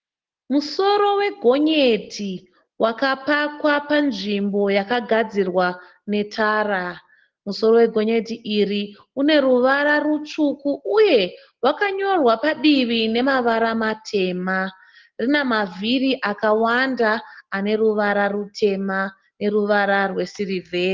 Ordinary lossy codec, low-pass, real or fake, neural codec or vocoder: Opus, 16 kbps; 7.2 kHz; real; none